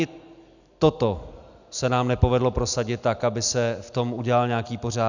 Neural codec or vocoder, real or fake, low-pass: none; real; 7.2 kHz